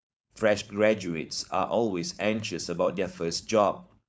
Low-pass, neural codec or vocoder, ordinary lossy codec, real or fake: none; codec, 16 kHz, 4.8 kbps, FACodec; none; fake